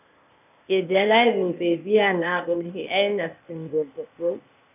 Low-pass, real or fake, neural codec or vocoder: 3.6 kHz; fake; codec, 16 kHz, 0.8 kbps, ZipCodec